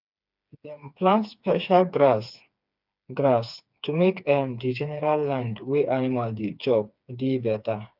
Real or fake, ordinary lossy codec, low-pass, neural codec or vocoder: fake; none; 5.4 kHz; codec, 16 kHz, 4 kbps, FreqCodec, smaller model